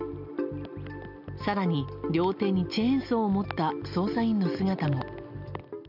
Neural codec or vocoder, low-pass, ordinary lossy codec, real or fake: none; 5.4 kHz; none; real